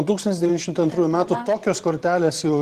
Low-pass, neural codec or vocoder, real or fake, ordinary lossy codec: 14.4 kHz; vocoder, 44.1 kHz, 128 mel bands every 512 samples, BigVGAN v2; fake; Opus, 16 kbps